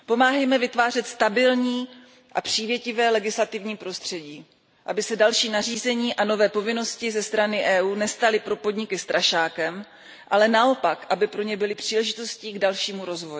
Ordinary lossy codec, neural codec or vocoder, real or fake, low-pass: none; none; real; none